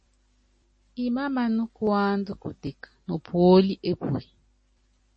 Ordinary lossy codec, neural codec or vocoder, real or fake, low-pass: MP3, 32 kbps; none; real; 9.9 kHz